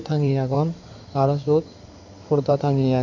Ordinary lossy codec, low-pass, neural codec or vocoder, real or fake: none; 7.2 kHz; codec, 16 kHz in and 24 kHz out, 2.2 kbps, FireRedTTS-2 codec; fake